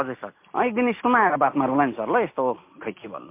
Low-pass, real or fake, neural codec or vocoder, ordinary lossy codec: 3.6 kHz; real; none; MP3, 24 kbps